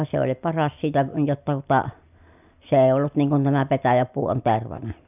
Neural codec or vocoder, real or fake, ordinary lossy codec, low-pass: none; real; none; 3.6 kHz